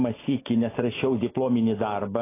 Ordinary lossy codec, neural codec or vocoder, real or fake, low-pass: AAC, 16 kbps; none; real; 3.6 kHz